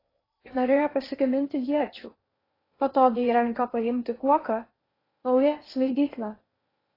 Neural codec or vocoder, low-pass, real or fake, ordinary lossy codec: codec, 16 kHz in and 24 kHz out, 0.8 kbps, FocalCodec, streaming, 65536 codes; 5.4 kHz; fake; AAC, 24 kbps